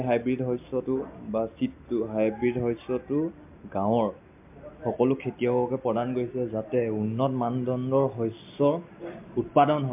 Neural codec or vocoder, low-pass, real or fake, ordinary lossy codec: none; 3.6 kHz; real; MP3, 32 kbps